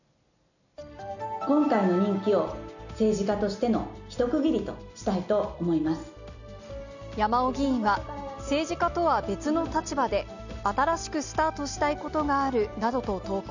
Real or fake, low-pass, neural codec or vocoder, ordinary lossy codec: real; 7.2 kHz; none; none